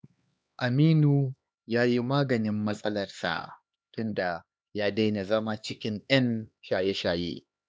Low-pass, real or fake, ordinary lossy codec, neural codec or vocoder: none; fake; none; codec, 16 kHz, 2 kbps, X-Codec, HuBERT features, trained on LibriSpeech